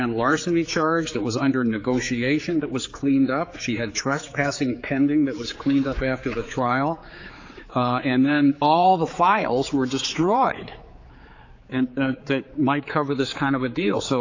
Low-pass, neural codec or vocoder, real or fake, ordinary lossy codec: 7.2 kHz; codec, 16 kHz, 4 kbps, X-Codec, HuBERT features, trained on general audio; fake; AAC, 48 kbps